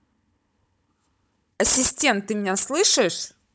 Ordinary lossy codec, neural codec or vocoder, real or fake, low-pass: none; codec, 16 kHz, 16 kbps, FunCodec, trained on Chinese and English, 50 frames a second; fake; none